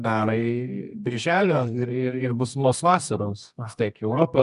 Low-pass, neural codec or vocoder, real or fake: 10.8 kHz; codec, 24 kHz, 0.9 kbps, WavTokenizer, medium music audio release; fake